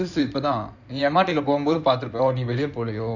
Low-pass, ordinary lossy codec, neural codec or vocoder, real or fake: 7.2 kHz; none; codec, 16 kHz in and 24 kHz out, 2.2 kbps, FireRedTTS-2 codec; fake